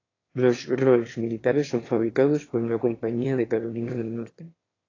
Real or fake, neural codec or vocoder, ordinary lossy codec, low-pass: fake; autoencoder, 22.05 kHz, a latent of 192 numbers a frame, VITS, trained on one speaker; AAC, 32 kbps; 7.2 kHz